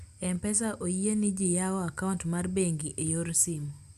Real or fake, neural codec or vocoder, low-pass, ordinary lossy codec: real; none; 14.4 kHz; Opus, 64 kbps